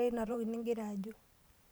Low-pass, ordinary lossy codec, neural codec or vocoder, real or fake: none; none; vocoder, 44.1 kHz, 128 mel bands every 512 samples, BigVGAN v2; fake